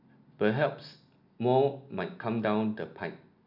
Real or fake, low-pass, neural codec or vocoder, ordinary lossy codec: real; 5.4 kHz; none; none